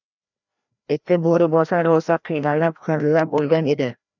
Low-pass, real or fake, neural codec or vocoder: 7.2 kHz; fake; codec, 16 kHz, 1 kbps, FreqCodec, larger model